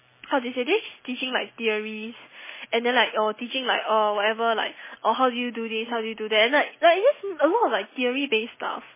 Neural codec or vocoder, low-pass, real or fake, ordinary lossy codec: none; 3.6 kHz; real; MP3, 16 kbps